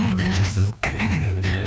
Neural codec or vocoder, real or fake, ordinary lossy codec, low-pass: codec, 16 kHz, 1 kbps, FreqCodec, larger model; fake; none; none